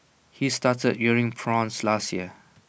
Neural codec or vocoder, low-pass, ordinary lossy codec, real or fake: none; none; none; real